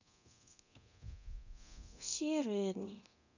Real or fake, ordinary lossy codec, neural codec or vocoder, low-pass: fake; none; codec, 24 kHz, 0.9 kbps, DualCodec; 7.2 kHz